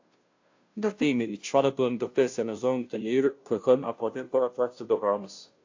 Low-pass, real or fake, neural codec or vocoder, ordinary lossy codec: 7.2 kHz; fake; codec, 16 kHz, 0.5 kbps, FunCodec, trained on Chinese and English, 25 frames a second; AAC, 48 kbps